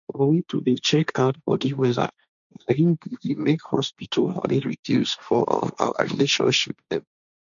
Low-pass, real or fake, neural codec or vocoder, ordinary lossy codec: 7.2 kHz; fake; codec, 16 kHz, 1.1 kbps, Voila-Tokenizer; none